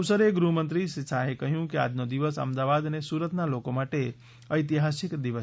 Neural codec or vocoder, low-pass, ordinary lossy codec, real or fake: none; none; none; real